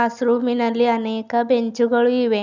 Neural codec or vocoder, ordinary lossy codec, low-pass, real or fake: none; none; 7.2 kHz; real